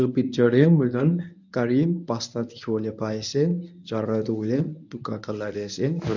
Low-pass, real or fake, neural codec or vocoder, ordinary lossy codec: 7.2 kHz; fake; codec, 24 kHz, 0.9 kbps, WavTokenizer, medium speech release version 1; none